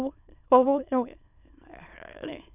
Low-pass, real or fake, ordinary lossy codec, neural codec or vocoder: 3.6 kHz; fake; none; autoencoder, 22.05 kHz, a latent of 192 numbers a frame, VITS, trained on many speakers